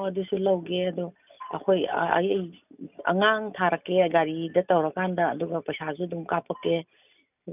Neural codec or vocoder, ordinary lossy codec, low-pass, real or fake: none; none; 3.6 kHz; real